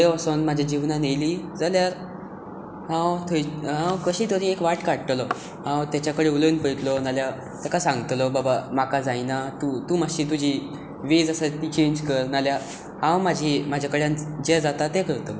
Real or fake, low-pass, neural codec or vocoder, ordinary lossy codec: real; none; none; none